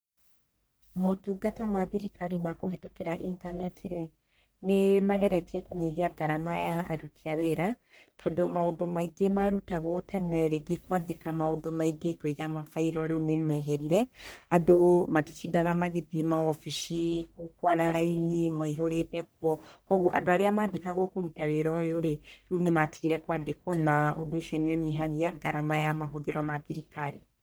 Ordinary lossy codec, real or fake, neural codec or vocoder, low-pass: none; fake; codec, 44.1 kHz, 1.7 kbps, Pupu-Codec; none